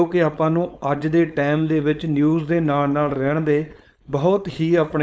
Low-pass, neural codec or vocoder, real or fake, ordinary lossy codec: none; codec, 16 kHz, 4.8 kbps, FACodec; fake; none